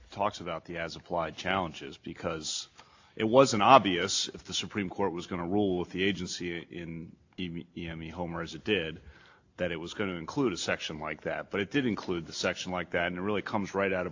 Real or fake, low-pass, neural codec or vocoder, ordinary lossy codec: real; 7.2 kHz; none; AAC, 48 kbps